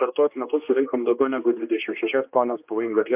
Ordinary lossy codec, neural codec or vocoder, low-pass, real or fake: MP3, 32 kbps; codec, 16 kHz, 2 kbps, X-Codec, HuBERT features, trained on general audio; 3.6 kHz; fake